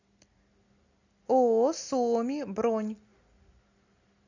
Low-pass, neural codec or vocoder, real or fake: 7.2 kHz; none; real